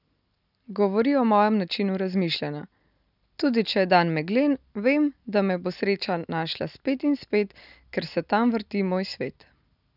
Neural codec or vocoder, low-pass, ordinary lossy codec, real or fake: none; 5.4 kHz; none; real